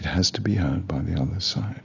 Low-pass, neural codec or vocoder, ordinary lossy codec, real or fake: 7.2 kHz; none; AAC, 32 kbps; real